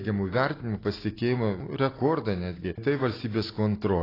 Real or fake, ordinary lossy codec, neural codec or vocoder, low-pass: real; AAC, 24 kbps; none; 5.4 kHz